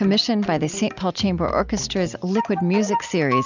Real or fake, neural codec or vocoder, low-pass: real; none; 7.2 kHz